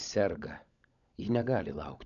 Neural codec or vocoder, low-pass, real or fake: codec, 16 kHz, 16 kbps, FunCodec, trained on LibriTTS, 50 frames a second; 7.2 kHz; fake